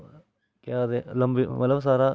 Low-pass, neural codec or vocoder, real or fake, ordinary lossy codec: none; none; real; none